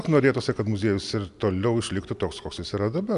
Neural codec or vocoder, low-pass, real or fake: none; 10.8 kHz; real